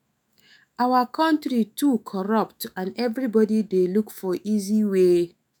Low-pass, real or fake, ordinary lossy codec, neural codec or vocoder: none; fake; none; autoencoder, 48 kHz, 128 numbers a frame, DAC-VAE, trained on Japanese speech